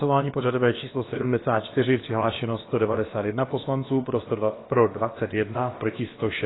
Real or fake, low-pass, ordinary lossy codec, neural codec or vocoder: fake; 7.2 kHz; AAC, 16 kbps; codec, 16 kHz, about 1 kbps, DyCAST, with the encoder's durations